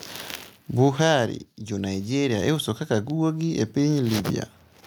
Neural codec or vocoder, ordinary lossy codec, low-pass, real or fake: none; none; none; real